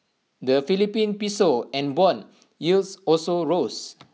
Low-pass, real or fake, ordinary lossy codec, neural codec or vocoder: none; real; none; none